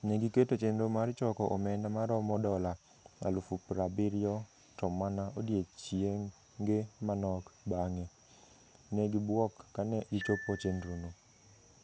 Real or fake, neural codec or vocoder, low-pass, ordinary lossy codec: real; none; none; none